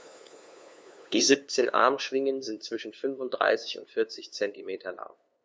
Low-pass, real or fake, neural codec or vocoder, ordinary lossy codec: none; fake; codec, 16 kHz, 2 kbps, FunCodec, trained on LibriTTS, 25 frames a second; none